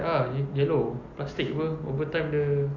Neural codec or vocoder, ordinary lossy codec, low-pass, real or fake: none; none; 7.2 kHz; real